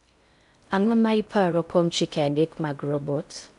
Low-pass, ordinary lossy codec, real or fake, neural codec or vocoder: 10.8 kHz; none; fake; codec, 16 kHz in and 24 kHz out, 0.6 kbps, FocalCodec, streaming, 4096 codes